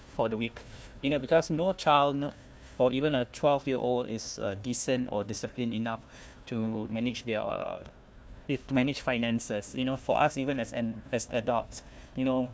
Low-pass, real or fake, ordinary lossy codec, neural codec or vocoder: none; fake; none; codec, 16 kHz, 1 kbps, FunCodec, trained on Chinese and English, 50 frames a second